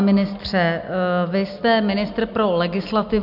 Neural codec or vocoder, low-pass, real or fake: none; 5.4 kHz; real